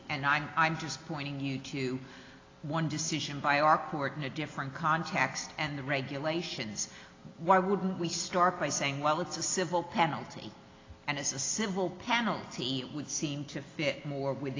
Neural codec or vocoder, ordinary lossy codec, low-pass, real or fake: none; AAC, 32 kbps; 7.2 kHz; real